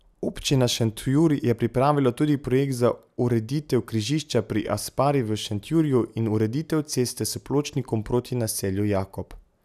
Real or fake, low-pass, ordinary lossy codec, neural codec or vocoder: real; 14.4 kHz; none; none